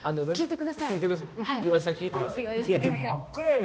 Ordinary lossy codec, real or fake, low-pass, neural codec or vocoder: none; fake; none; codec, 16 kHz, 1 kbps, X-Codec, HuBERT features, trained on balanced general audio